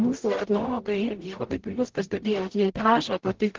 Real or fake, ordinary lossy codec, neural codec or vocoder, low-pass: fake; Opus, 16 kbps; codec, 44.1 kHz, 0.9 kbps, DAC; 7.2 kHz